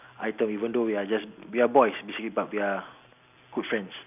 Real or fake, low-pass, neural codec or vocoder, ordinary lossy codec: real; 3.6 kHz; none; none